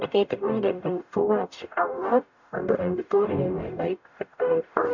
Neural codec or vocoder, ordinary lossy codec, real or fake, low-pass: codec, 44.1 kHz, 0.9 kbps, DAC; none; fake; 7.2 kHz